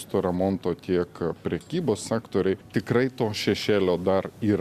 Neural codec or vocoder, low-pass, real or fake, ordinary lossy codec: none; 14.4 kHz; real; Opus, 64 kbps